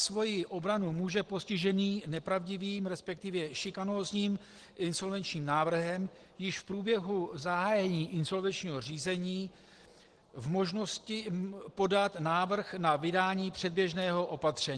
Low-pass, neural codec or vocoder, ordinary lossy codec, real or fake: 10.8 kHz; none; Opus, 16 kbps; real